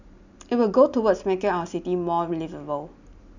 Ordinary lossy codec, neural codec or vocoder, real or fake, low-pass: none; none; real; 7.2 kHz